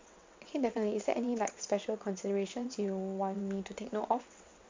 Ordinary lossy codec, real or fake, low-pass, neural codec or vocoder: MP3, 48 kbps; fake; 7.2 kHz; vocoder, 22.05 kHz, 80 mel bands, WaveNeXt